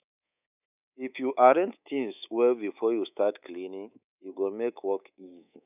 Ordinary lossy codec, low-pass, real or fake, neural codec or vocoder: none; 3.6 kHz; fake; codec, 24 kHz, 3.1 kbps, DualCodec